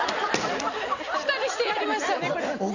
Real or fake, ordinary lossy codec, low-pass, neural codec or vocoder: real; none; 7.2 kHz; none